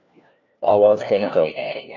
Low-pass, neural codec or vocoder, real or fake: 7.2 kHz; codec, 16 kHz, 1 kbps, FunCodec, trained on LibriTTS, 50 frames a second; fake